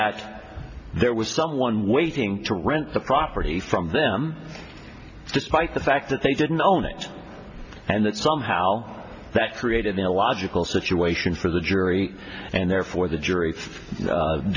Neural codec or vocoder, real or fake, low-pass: none; real; 7.2 kHz